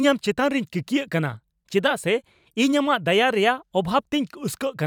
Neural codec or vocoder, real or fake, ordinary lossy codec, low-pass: vocoder, 44.1 kHz, 128 mel bands every 512 samples, BigVGAN v2; fake; none; 19.8 kHz